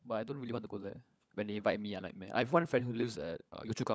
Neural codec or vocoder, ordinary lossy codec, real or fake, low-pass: codec, 16 kHz, 16 kbps, FunCodec, trained on LibriTTS, 50 frames a second; none; fake; none